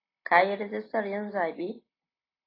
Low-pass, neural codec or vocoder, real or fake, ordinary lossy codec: 5.4 kHz; none; real; AAC, 24 kbps